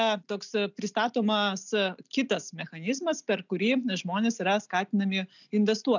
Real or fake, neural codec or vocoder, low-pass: real; none; 7.2 kHz